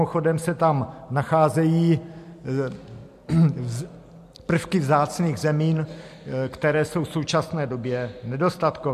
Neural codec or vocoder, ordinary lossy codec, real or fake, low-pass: none; MP3, 64 kbps; real; 14.4 kHz